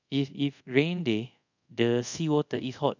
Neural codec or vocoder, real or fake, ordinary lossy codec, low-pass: codec, 24 kHz, 0.5 kbps, DualCodec; fake; none; 7.2 kHz